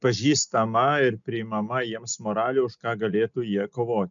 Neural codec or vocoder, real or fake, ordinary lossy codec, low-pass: none; real; MP3, 96 kbps; 7.2 kHz